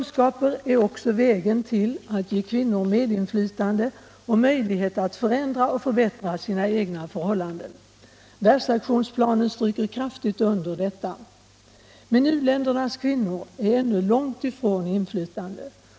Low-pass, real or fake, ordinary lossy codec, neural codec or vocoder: none; real; none; none